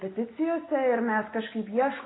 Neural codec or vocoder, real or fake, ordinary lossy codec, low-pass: none; real; AAC, 16 kbps; 7.2 kHz